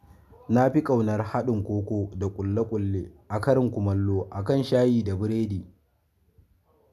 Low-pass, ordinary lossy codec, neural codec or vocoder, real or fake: 14.4 kHz; none; none; real